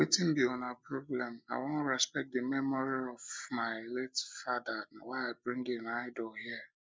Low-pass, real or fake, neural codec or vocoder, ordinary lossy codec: none; real; none; none